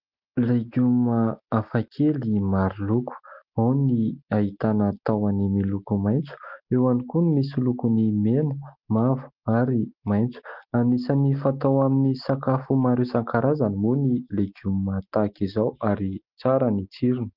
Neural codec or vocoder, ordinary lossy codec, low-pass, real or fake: none; Opus, 32 kbps; 5.4 kHz; real